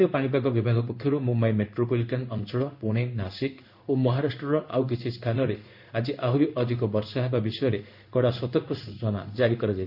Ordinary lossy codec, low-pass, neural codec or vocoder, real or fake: none; 5.4 kHz; codec, 16 kHz in and 24 kHz out, 1 kbps, XY-Tokenizer; fake